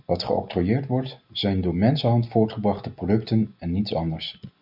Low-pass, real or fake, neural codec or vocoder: 5.4 kHz; real; none